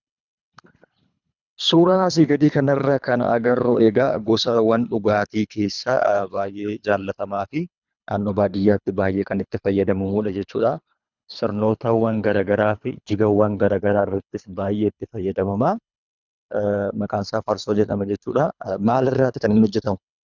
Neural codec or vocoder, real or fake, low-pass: codec, 24 kHz, 3 kbps, HILCodec; fake; 7.2 kHz